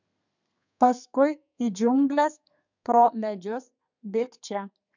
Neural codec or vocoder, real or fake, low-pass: codec, 32 kHz, 1.9 kbps, SNAC; fake; 7.2 kHz